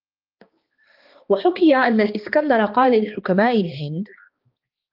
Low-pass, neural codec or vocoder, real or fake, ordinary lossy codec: 5.4 kHz; codec, 16 kHz, 2 kbps, X-Codec, HuBERT features, trained on balanced general audio; fake; Opus, 32 kbps